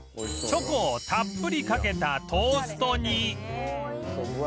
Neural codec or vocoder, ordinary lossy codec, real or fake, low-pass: none; none; real; none